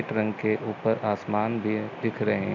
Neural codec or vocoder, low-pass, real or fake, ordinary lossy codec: none; 7.2 kHz; real; none